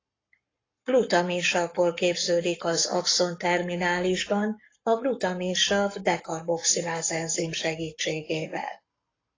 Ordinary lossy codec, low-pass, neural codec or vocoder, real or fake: AAC, 32 kbps; 7.2 kHz; codec, 44.1 kHz, 7.8 kbps, Pupu-Codec; fake